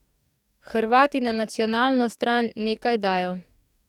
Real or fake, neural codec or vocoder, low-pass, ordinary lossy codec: fake; codec, 44.1 kHz, 2.6 kbps, DAC; 19.8 kHz; none